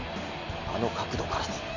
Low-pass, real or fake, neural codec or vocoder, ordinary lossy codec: 7.2 kHz; real; none; none